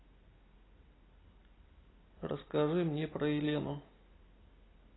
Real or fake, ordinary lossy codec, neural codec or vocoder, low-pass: real; AAC, 16 kbps; none; 7.2 kHz